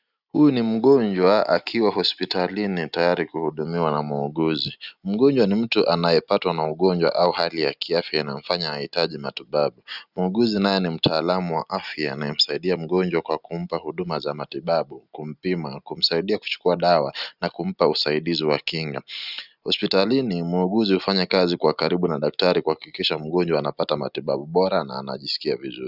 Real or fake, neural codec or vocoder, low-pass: real; none; 5.4 kHz